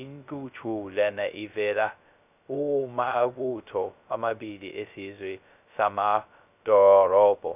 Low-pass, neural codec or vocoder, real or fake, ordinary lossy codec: 3.6 kHz; codec, 16 kHz, 0.2 kbps, FocalCodec; fake; none